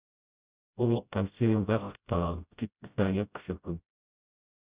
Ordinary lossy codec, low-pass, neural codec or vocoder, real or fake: Opus, 64 kbps; 3.6 kHz; codec, 16 kHz, 0.5 kbps, FreqCodec, smaller model; fake